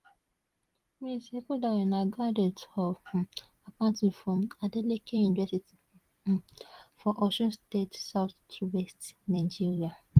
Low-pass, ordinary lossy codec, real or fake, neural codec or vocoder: 14.4 kHz; Opus, 24 kbps; fake; vocoder, 44.1 kHz, 128 mel bands every 256 samples, BigVGAN v2